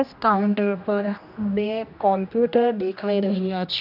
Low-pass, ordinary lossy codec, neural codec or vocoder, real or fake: 5.4 kHz; none; codec, 16 kHz, 1 kbps, X-Codec, HuBERT features, trained on general audio; fake